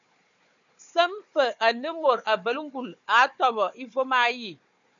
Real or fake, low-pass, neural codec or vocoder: fake; 7.2 kHz; codec, 16 kHz, 4 kbps, FunCodec, trained on Chinese and English, 50 frames a second